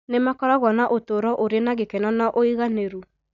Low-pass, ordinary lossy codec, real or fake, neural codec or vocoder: 7.2 kHz; none; real; none